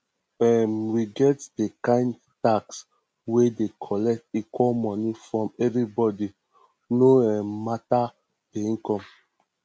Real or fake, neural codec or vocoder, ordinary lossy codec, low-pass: real; none; none; none